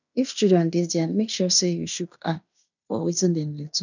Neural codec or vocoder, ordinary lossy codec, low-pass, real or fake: codec, 16 kHz in and 24 kHz out, 0.9 kbps, LongCat-Audio-Codec, fine tuned four codebook decoder; none; 7.2 kHz; fake